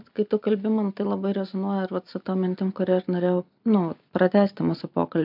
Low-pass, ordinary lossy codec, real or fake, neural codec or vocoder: 5.4 kHz; MP3, 48 kbps; real; none